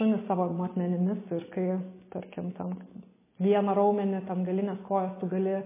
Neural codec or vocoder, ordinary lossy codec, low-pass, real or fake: none; MP3, 16 kbps; 3.6 kHz; real